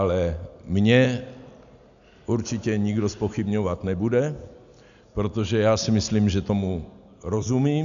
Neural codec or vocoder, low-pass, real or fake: none; 7.2 kHz; real